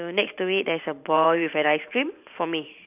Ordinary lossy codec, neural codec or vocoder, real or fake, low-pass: none; vocoder, 44.1 kHz, 128 mel bands every 512 samples, BigVGAN v2; fake; 3.6 kHz